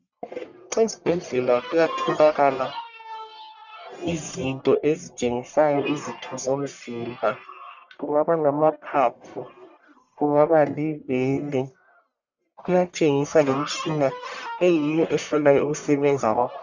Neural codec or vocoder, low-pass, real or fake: codec, 44.1 kHz, 1.7 kbps, Pupu-Codec; 7.2 kHz; fake